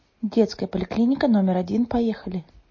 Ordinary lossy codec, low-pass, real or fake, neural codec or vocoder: MP3, 32 kbps; 7.2 kHz; real; none